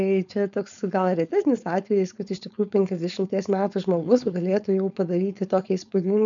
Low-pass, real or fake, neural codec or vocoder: 7.2 kHz; fake; codec, 16 kHz, 4.8 kbps, FACodec